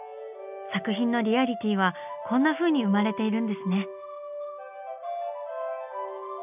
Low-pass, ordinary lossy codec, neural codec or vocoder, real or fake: 3.6 kHz; none; none; real